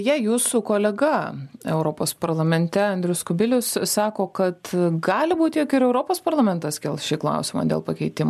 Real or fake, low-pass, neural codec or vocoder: real; 14.4 kHz; none